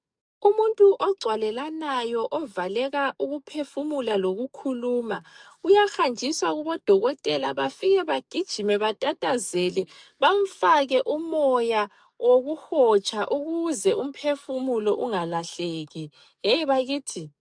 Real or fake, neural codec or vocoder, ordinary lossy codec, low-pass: fake; codec, 44.1 kHz, 7.8 kbps, DAC; MP3, 96 kbps; 9.9 kHz